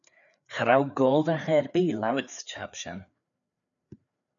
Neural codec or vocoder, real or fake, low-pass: codec, 16 kHz, 4 kbps, FreqCodec, larger model; fake; 7.2 kHz